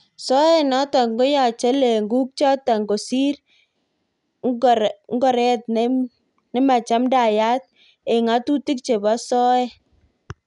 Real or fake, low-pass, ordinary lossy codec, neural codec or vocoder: real; 10.8 kHz; none; none